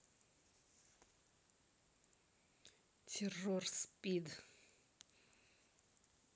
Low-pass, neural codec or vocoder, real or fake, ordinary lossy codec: none; none; real; none